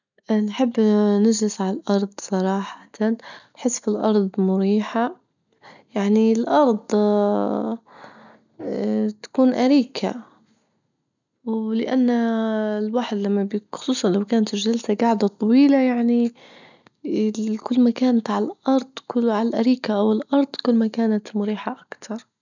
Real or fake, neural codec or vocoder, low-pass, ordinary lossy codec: real; none; 7.2 kHz; none